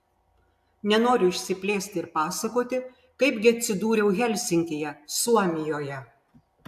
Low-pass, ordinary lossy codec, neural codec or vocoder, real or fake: 14.4 kHz; AAC, 96 kbps; none; real